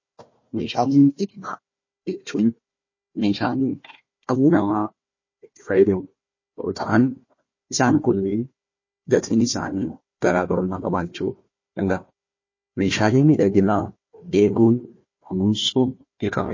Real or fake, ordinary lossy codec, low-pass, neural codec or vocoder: fake; MP3, 32 kbps; 7.2 kHz; codec, 16 kHz, 1 kbps, FunCodec, trained on Chinese and English, 50 frames a second